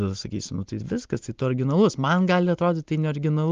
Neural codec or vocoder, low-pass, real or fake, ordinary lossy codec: none; 7.2 kHz; real; Opus, 24 kbps